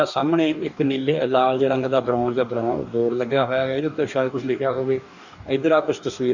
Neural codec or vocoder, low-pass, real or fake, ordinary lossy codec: codec, 44.1 kHz, 2.6 kbps, DAC; 7.2 kHz; fake; none